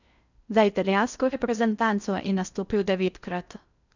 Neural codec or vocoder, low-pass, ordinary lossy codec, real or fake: codec, 16 kHz in and 24 kHz out, 0.6 kbps, FocalCodec, streaming, 4096 codes; 7.2 kHz; none; fake